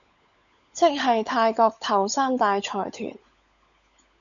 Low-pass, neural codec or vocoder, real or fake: 7.2 kHz; codec, 16 kHz, 16 kbps, FunCodec, trained on LibriTTS, 50 frames a second; fake